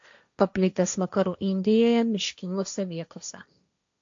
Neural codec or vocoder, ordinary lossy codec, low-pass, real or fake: codec, 16 kHz, 1.1 kbps, Voila-Tokenizer; AAC, 64 kbps; 7.2 kHz; fake